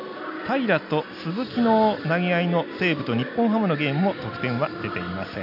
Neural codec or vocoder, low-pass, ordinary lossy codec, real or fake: none; 5.4 kHz; none; real